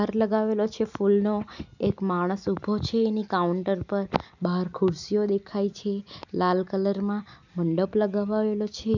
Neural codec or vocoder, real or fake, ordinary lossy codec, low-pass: none; real; none; 7.2 kHz